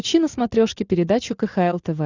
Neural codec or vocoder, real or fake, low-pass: none; real; 7.2 kHz